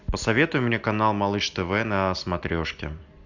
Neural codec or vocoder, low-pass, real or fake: none; 7.2 kHz; real